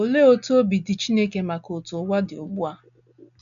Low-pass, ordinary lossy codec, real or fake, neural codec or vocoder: 7.2 kHz; none; real; none